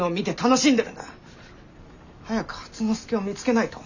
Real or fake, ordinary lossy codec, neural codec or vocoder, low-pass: real; none; none; 7.2 kHz